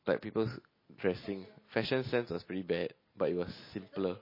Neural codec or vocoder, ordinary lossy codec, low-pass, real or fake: none; MP3, 24 kbps; 5.4 kHz; real